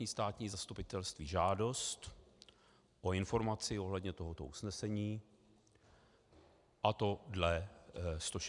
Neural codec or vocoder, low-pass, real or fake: none; 10.8 kHz; real